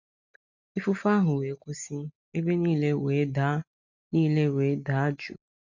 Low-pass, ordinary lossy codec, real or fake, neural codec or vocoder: 7.2 kHz; none; real; none